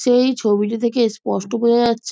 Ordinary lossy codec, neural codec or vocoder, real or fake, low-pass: none; none; real; none